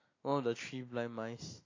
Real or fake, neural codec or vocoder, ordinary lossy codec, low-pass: real; none; AAC, 32 kbps; 7.2 kHz